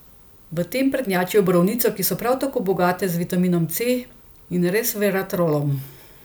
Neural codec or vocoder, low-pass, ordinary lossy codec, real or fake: none; none; none; real